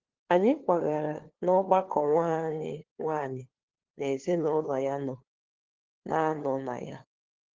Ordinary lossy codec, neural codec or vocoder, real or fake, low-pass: Opus, 16 kbps; codec, 16 kHz, 2 kbps, FunCodec, trained on LibriTTS, 25 frames a second; fake; 7.2 kHz